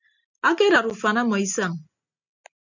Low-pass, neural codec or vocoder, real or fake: 7.2 kHz; none; real